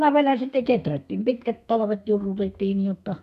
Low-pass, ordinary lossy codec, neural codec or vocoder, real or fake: 14.4 kHz; none; codec, 32 kHz, 1.9 kbps, SNAC; fake